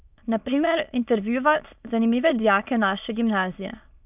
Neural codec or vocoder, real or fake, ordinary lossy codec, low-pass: autoencoder, 22.05 kHz, a latent of 192 numbers a frame, VITS, trained on many speakers; fake; none; 3.6 kHz